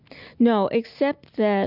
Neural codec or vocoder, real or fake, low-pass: none; real; 5.4 kHz